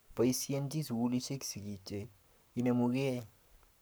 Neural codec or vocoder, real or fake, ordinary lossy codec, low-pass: codec, 44.1 kHz, 7.8 kbps, Pupu-Codec; fake; none; none